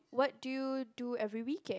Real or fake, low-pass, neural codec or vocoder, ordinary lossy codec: real; none; none; none